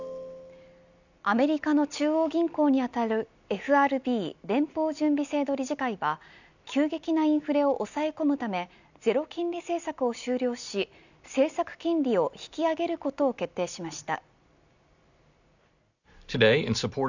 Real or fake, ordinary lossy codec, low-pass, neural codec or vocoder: real; none; 7.2 kHz; none